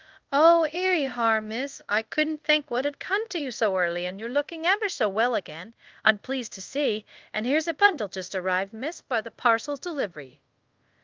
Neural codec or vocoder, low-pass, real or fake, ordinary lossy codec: codec, 24 kHz, 0.5 kbps, DualCodec; 7.2 kHz; fake; Opus, 32 kbps